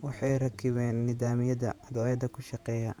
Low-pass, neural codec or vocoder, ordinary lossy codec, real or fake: 19.8 kHz; vocoder, 48 kHz, 128 mel bands, Vocos; none; fake